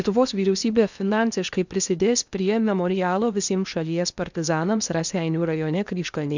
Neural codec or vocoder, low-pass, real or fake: codec, 16 kHz in and 24 kHz out, 0.8 kbps, FocalCodec, streaming, 65536 codes; 7.2 kHz; fake